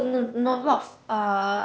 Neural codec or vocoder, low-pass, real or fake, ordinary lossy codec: codec, 16 kHz, 0.8 kbps, ZipCodec; none; fake; none